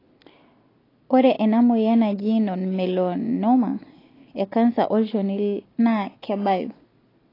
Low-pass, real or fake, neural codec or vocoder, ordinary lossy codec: 5.4 kHz; real; none; AAC, 24 kbps